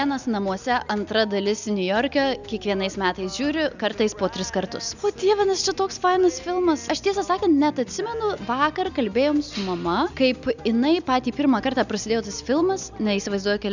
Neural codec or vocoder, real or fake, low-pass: none; real; 7.2 kHz